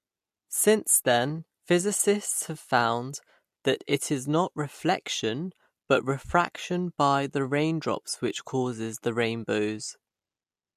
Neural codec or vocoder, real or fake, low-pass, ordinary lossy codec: none; real; 14.4 kHz; MP3, 64 kbps